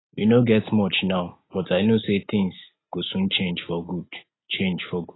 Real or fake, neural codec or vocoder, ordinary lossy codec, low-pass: real; none; AAC, 16 kbps; 7.2 kHz